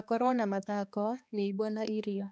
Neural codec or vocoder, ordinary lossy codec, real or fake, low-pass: codec, 16 kHz, 2 kbps, X-Codec, HuBERT features, trained on balanced general audio; none; fake; none